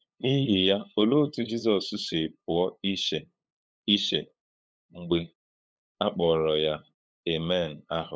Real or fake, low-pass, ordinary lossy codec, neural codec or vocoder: fake; none; none; codec, 16 kHz, 8 kbps, FunCodec, trained on LibriTTS, 25 frames a second